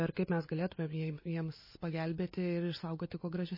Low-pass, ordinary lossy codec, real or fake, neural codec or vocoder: 5.4 kHz; MP3, 24 kbps; real; none